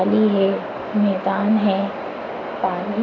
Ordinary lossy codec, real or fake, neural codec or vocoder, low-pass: AAC, 32 kbps; real; none; 7.2 kHz